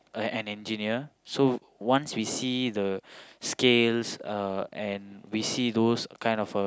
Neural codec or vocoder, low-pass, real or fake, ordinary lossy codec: none; none; real; none